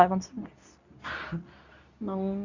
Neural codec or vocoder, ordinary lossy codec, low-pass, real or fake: codec, 24 kHz, 0.9 kbps, WavTokenizer, medium speech release version 2; none; 7.2 kHz; fake